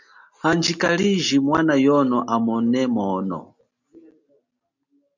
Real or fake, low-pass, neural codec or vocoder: real; 7.2 kHz; none